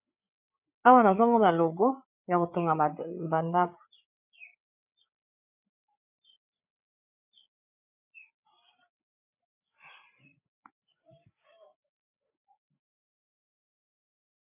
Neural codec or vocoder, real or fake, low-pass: codec, 16 kHz, 8 kbps, FreqCodec, larger model; fake; 3.6 kHz